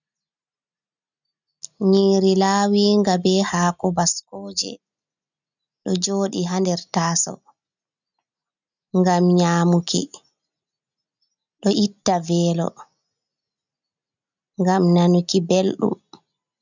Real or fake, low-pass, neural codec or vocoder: real; 7.2 kHz; none